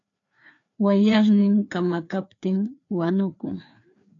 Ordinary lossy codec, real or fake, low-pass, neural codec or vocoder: AAC, 48 kbps; fake; 7.2 kHz; codec, 16 kHz, 2 kbps, FreqCodec, larger model